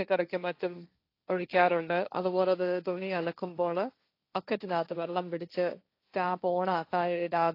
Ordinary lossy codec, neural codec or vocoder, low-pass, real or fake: AAC, 32 kbps; codec, 16 kHz, 1.1 kbps, Voila-Tokenizer; 5.4 kHz; fake